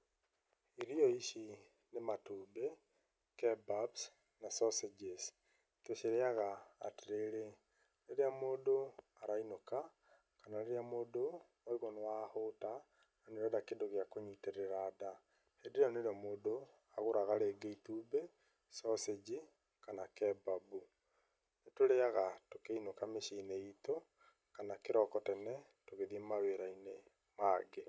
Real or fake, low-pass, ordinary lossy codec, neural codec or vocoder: real; none; none; none